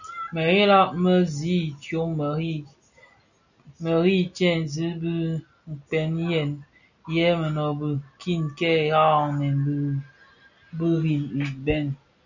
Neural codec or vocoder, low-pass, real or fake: none; 7.2 kHz; real